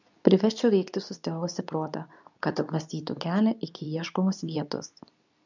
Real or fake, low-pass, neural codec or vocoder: fake; 7.2 kHz; codec, 24 kHz, 0.9 kbps, WavTokenizer, medium speech release version 2